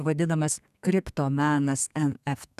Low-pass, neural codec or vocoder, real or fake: 14.4 kHz; codec, 32 kHz, 1.9 kbps, SNAC; fake